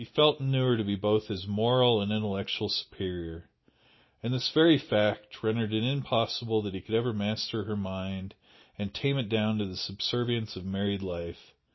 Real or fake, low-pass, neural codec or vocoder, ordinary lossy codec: real; 7.2 kHz; none; MP3, 24 kbps